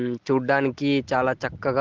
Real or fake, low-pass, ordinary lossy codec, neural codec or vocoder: real; 7.2 kHz; Opus, 16 kbps; none